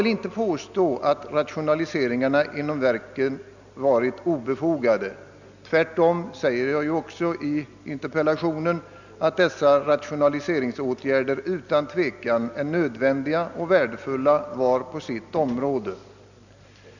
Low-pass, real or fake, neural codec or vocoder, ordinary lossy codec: 7.2 kHz; real; none; none